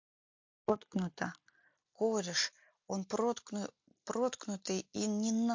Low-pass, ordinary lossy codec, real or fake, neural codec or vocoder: 7.2 kHz; MP3, 48 kbps; real; none